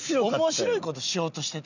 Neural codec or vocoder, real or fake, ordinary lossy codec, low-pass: none; real; none; 7.2 kHz